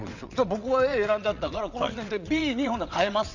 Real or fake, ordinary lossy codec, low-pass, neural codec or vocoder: fake; none; 7.2 kHz; vocoder, 22.05 kHz, 80 mel bands, WaveNeXt